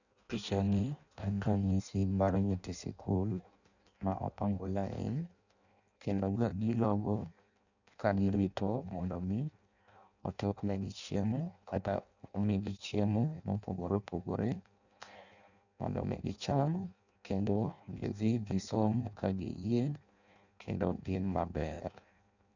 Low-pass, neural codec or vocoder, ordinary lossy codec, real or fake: 7.2 kHz; codec, 16 kHz in and 24 kHz out, 0.6 kbps, FireRedTTS-2 codec; none; fake